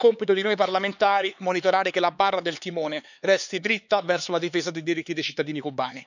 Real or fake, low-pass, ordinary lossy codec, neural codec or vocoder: fake; 7.2 kHz; none; codec, 16 kHz, 4 kbps, X-Codec, HuBERT features, trained on LibriSpeech